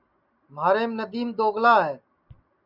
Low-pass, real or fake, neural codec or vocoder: 5.4 kHz; real; none